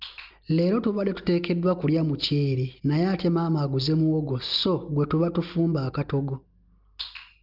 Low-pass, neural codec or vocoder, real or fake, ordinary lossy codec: 5.4 kHz; none; real; Opus, 24 kbps